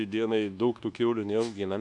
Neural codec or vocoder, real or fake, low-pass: codec, 24 kHz, 1.2 kbps, DualCodec; fake; 10.8 kHz